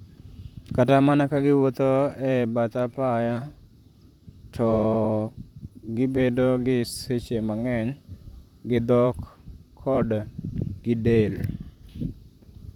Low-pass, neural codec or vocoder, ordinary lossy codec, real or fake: 19.8 kHz; vocoder, 44.1 kHz, 128 mel bands, Pupu-Vocoder; none; fake